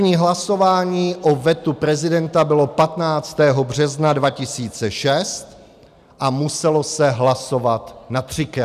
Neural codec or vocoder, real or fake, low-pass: none; real; 14.4 kHz